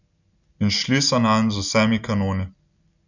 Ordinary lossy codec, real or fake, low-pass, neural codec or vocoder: none; real; 7.2 kHz; none